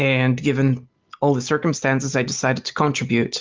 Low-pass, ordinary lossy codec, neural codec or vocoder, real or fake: 7.2 kHz; Opus, 32 kbps; none; real